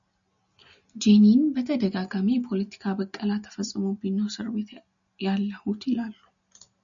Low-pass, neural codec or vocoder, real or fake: 7.2 kHz; none; real